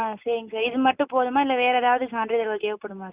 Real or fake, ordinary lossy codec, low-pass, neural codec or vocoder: real; Opus, 64 kbps; 3.6 kHz; none